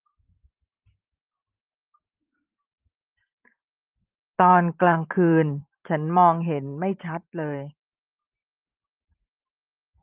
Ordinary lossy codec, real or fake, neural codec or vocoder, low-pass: Opus, 32 kbps; fake; vocoder, 44.1 kHz, 128 mel bands every 512 samples, BigVGAN v2; 3.6 kHz